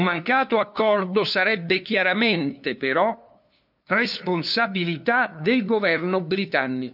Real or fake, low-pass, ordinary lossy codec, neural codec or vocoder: fake; 5.4 kHz; none; codec, 16 kHz, 2 kbps, FunCodec, trained on LibriTTS, 25 frames a second